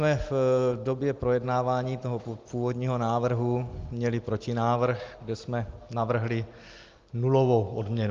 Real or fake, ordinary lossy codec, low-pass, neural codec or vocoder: real; Opus, 32 kbps; 7.2 kHz; none